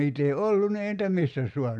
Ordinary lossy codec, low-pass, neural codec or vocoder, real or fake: none; none; none; real